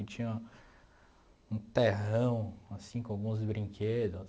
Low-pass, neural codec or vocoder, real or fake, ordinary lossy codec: none; none; real; none